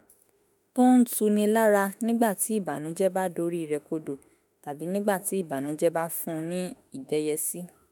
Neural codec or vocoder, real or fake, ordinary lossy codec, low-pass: autoencoder, 48 kHz, 32 numbers a frame, DAC-VAE, trained on Japanese speech; fake; none; none